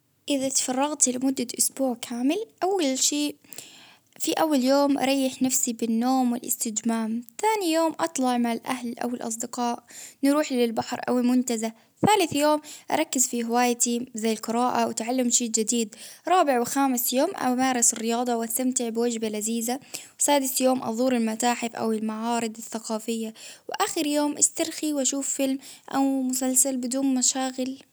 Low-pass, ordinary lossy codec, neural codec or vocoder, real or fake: none; none; none; real